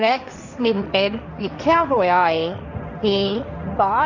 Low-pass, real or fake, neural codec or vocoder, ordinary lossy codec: 7.2 kHz; fake; codec, 16 kHz, 1.1 kbps, Voila-Tokenizer; none